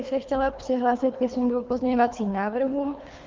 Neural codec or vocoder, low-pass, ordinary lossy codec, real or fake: codec, 24 kHz, 3 kbps, HILCodec; 7.2 kHz; Opus, 32 kbps; fake